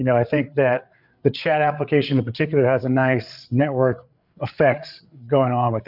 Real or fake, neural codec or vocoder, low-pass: fake; codec, 16 kHz, 8 kbps, FreqCodec, larger model; 5.4 kHz